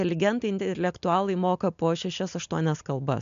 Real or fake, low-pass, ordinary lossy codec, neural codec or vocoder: real; 7.2 kHz; MP3, 64 kbps; none